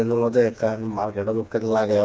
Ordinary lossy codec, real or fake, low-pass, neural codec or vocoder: none; fake; none; codec, 16 kHz, 2 kbps, FreqCodec, smaller model